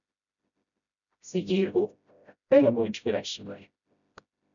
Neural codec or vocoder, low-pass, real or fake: codec, 16 kHz, 0.5 kbps, FreqCodec, smaller model; 7.2 kHz; fake